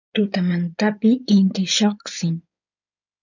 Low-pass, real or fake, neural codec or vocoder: 7.2 kHz; fake; codec, 16 kHz in and 24 kHz out, 2.2 kbps, FireRedTTS-2 codec